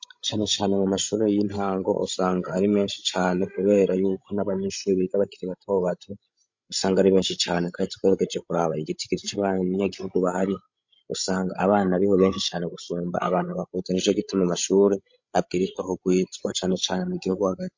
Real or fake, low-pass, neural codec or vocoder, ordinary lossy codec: fake; 7.2 kHz; codec, 16 kHz, 16 kbps, FreqCodec, larger model; MP3, 48 kbps